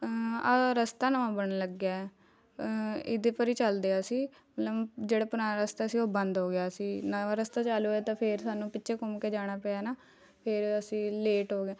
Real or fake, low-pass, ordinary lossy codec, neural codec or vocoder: real; none; none; none